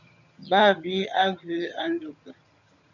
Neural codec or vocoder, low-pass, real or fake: vocoder, 22.05 kHz, 80 mel bands, HiFi-GAN; 7.2 kHz; fake